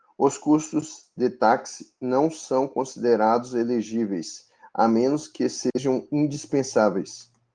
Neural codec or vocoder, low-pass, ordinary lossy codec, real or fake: none; 9.9 kHz; Opus, 24 kbps; real